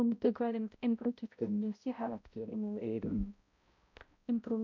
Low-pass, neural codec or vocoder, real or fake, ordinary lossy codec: 7.2 kHz; codec, 16 kHz, 0.5 kbps, X-Codec, HuBERT features, trained on balanced general audio; fake; none